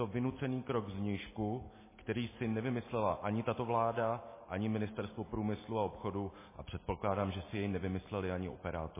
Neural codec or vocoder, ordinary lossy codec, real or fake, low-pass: none; MP3, 16 kbps; real; 3.6 kHz